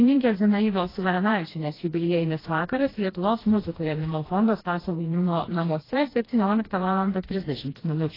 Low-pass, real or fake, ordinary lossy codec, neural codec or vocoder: 5.4 kHz; fake; AAC, 24 kbps; codec, 16 kHz, 1 kbps, FreqCodec, smaller model